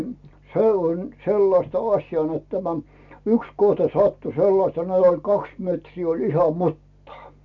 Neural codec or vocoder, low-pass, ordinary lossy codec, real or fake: none; 7.2 kHz; MP3, 64 kbps; real